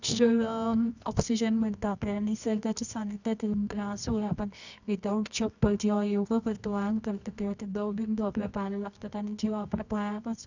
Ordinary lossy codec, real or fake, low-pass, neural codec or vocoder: none; fake; 7.2 kHz; codec, 24 kHz, 0.9 kbps, WavTokenizer, medium music audio release